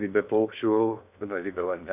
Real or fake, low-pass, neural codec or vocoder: fake; 3.6 kHz; codec, 16 kHz in and 24 kHz out, 0.6 kbps, FocalCodec, streaming, 2048 codes